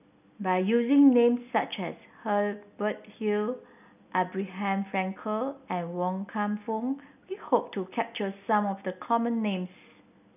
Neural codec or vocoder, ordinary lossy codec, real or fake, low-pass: none; none; real; 3.6 kHz